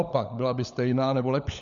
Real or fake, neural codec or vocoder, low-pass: fake; codec, 16 kHz, 16 kbps, FunCodec, trained on LibriTTS, 50 frames a second; 7.2 kHz